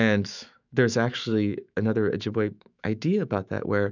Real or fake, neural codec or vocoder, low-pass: fake; autoencoder, 48 kHz, 128 numbers a frame, DAC-VAE, trained on Japanese speech; 7.2 kHz